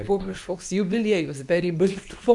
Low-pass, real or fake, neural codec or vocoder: 10.8 kHz; fake; codec, 24 kHz, 0.9 kbps, WavTokenizer, medium speech release version 2